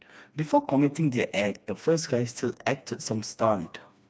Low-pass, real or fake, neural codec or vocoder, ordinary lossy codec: none; fake; codec, 16 kHz, 2 kbps, FreqCodec, smaller model; none